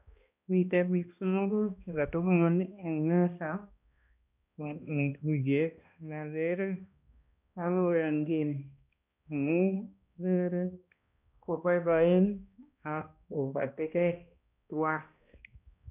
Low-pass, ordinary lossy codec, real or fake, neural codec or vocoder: 3.6 kHz; none; fake; codec, 16 kHz, 1 kbps, X-Codec, HuBERT features, trained on balanced general audio